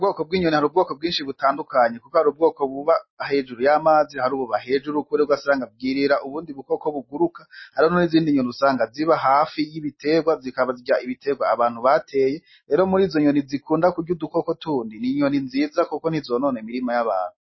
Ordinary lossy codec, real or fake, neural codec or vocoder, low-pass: MP3, 24 kbps; real; none; 7.2 kHz